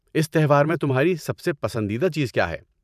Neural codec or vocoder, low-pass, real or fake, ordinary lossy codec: vocoder, 44.1 kHz, 128 mel bands every 512 samples, BigVGAN v2; 14.4 kHz; fake; none